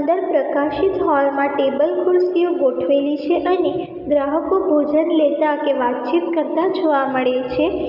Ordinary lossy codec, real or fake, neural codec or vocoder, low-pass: none; real; none; 5.4 kHz